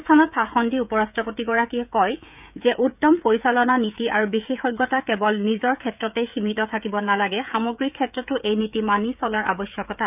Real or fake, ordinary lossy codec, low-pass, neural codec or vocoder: fake; none; 3.6 kHz; codec, 16 kHz, 16 kbps, FreqCodec, smaller model